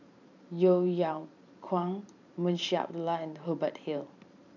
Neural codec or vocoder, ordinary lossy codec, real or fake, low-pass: vocoder, 44.1 kHz, 128 mel bands every 512 samples, BigVGAN v2; none; fake; 7.2 kHz